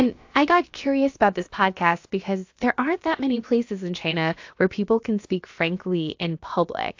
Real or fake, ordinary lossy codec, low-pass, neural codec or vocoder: fake; AAC, 48 kbps; 7.2 kHz; codec, 16 kHz, about 1 kbps, DyCAST, with the encoder's durations